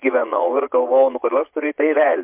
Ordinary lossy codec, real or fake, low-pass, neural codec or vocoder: MP3, 32 kbps; fake; 3.6 kHz; vocoder, 22.05 kHz, 80 mel bands, Vocos